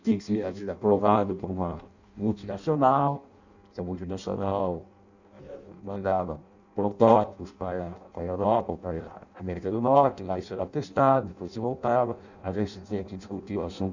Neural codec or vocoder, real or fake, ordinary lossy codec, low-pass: codec, 16 kHz in and 24 kHz out, 0.6 kbps, FireRedTTS-2 codec; fake; none; 7.2 kHz